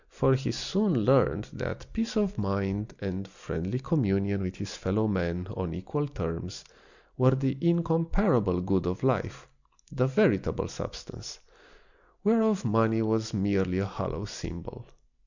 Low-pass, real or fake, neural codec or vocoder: 7.2 kHz; real; none